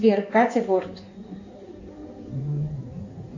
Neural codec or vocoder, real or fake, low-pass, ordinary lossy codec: codec, 16 kHz in and 24 kHz out, 2.2 kbps, FireRedTTS-2 codec; fake; 7.2 kHz; AAC, 48 kbps